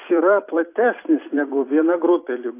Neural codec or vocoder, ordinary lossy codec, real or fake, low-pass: vocoder, 44.1 kHz, 128 mel bands every 256 samples, BigVGAN v2; AAC, 24 kbps; fake; 3.6 kHz